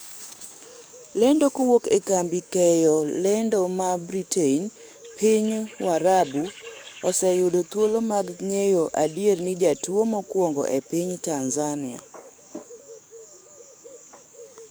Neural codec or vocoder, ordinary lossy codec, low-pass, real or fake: codec, 44.1 kHz, 7.8 kbps, DAC; none; none; fake